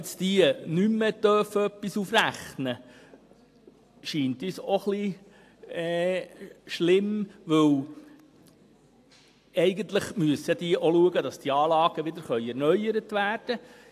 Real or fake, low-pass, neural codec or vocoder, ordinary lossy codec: real; 14.4 kHz; none; AAC, 64 kbps